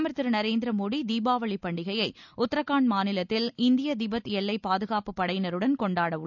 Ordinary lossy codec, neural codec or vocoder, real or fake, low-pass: none; none; real; 7.2 kHz